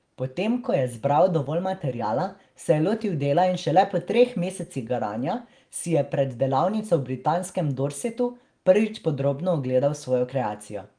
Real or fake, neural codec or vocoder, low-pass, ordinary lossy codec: real; none; 9.9 kHz; Opus, 24 kbps